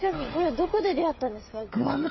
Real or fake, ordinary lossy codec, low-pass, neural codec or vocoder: fake; MP3, 24 kbps; 7.2 kHz; codec, 16 kHz, 8 kbps, FreqCodec, smaller model